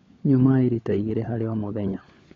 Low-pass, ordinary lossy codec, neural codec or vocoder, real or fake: 7.2 kHz; AAC, 32 kbps; codec, 16 kHz, 16 kbps, FunCodec, trained on LibriTTS, 50 frames a second; fake